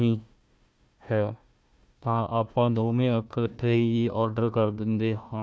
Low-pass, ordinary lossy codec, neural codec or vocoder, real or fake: none; none; codec, 16 kHz, 1 kbps, FunCodec, trained on Chinese and English, 50 frames a second; fake